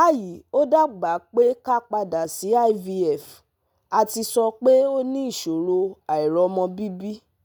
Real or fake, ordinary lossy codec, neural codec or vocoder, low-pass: real; none; none; none